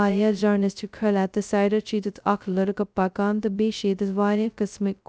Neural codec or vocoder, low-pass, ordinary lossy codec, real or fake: codec, 16 kHz, 0.2 kbps, FocalCodec; none; none; fake